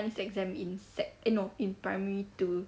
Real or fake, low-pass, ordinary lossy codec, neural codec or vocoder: real; none; none; none